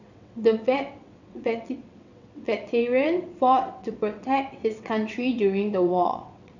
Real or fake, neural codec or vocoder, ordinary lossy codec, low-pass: fake; vocoder, 22.05 kHz, 80 mel bands, WaveNeXt; none; 7.2 kHz